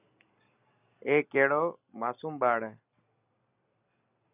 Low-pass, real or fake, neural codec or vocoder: 3.6 kHz; real; none